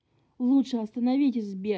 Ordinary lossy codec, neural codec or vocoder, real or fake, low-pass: none; none; real; none